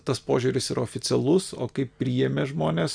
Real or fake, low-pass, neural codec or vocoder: real; 9.9 kHz; none